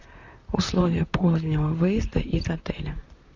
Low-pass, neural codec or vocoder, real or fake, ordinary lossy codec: 7.2 kHz; vocoder, 44.1 kHz, 128 mel bands, Pupu-Vocoder; fake; Opus, 64 kbps